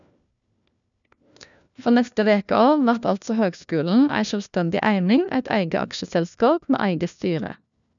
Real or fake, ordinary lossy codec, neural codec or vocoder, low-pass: fake; none; codec, 16 kHz, 1 kbps, FunCodec, trained on LibriTTS, 50 frames a second; 7.2 kHz